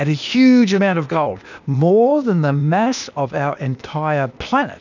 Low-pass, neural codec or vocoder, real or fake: 7.2 kHz; codec, 16 kHz, 0.8 kbps, ZipCodec; fake